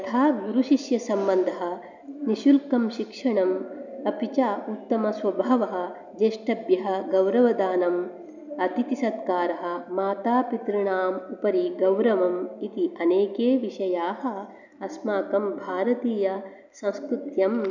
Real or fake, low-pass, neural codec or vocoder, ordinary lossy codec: real; 7.2 kHz; none; none